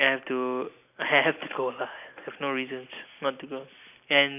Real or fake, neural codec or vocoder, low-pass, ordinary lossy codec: real; none; 3.6 kHz; none